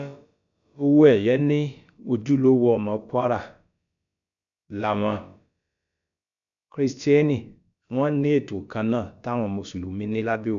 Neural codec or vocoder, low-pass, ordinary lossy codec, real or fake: codec, 16 kHz, about 1 kbps, DyCAST, with the encoder's durations; 7.2 kHz; none; fake